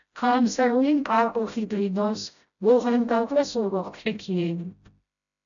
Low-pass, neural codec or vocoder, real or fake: 7.2 kHz; codec, 16 kHz, 0.5 kbps, FreqCodec, smaller model; fake